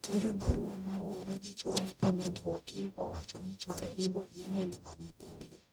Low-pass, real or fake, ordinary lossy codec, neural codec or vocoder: none; fake; none; codec, 44.1 kHz, 0.9 kbps, DAC